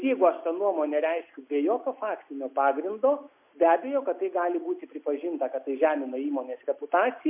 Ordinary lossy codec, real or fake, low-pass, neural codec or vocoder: MP3, 32 kbps; real; 3.6 kHz; none